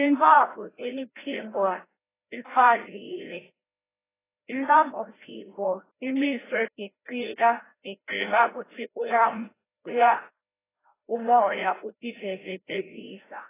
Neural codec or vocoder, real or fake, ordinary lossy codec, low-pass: codec, 16 kHz, 0.5 kbps, FreqCodec, larger model; fake; AAC, 16 kbps; 3.6 kHz